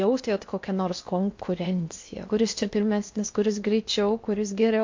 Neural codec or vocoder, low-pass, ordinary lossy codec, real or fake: codec, 16 kHz in and 24 kHz out, 0.8 kbps, FocalCodec, streaming, 65536 codes; 7.2 kHz; MP3, 48 kbps; fake